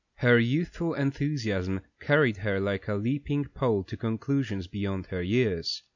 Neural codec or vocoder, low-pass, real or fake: none; 7.2 kHz; real